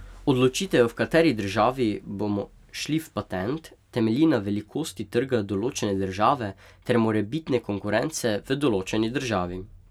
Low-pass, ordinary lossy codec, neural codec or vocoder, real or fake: 19.8 kHz; none; none; real